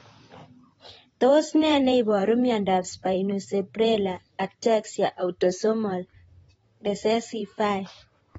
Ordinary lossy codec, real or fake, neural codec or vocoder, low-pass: AAC, 24 kbps; fake; vocoder, 44.1 kHz, 128 mel bands, Pupu-Vocoder; 19.8 kHz